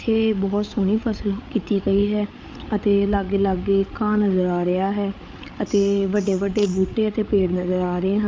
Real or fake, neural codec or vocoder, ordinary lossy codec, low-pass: fake; codec, 16 kHz, 8 kbps, FreqCodec, larger model; none; none